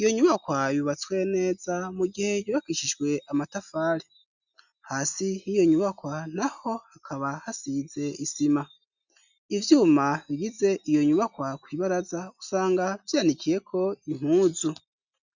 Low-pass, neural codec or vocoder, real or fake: 7.2 kHz; none; real